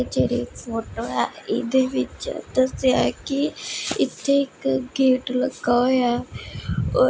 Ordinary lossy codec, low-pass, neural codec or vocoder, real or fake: none; none; none; real